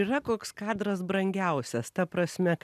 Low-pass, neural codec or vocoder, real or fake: 14.4 kHz; none; real